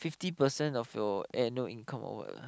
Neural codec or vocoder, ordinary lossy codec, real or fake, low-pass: none; none; real; none